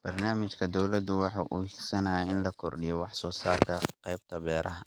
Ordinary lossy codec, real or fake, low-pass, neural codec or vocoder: none; fake; none; codec, 44.1 kHz, 7.8 kbps, DAC